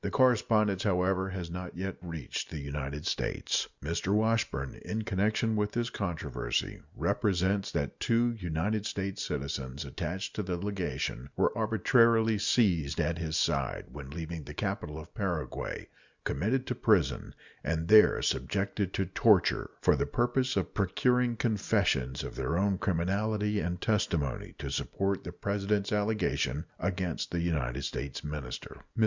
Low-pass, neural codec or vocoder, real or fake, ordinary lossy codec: 7.2 kHz; none; real; Opus, 64 kbps